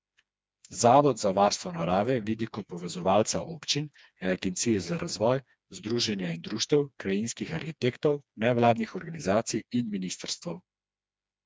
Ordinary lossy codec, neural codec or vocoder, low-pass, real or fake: none; codec, 16 kHz, 2 kbps, FreqCodec, smaller model; none; fake